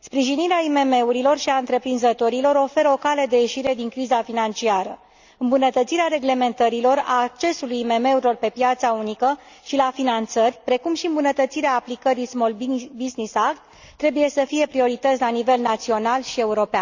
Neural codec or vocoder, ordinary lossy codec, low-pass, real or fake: none; Opus, 64 kbps; 7.2 kHz; real